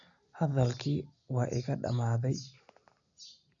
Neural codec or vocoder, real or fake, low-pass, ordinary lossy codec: none; real; 7.2 kHz; none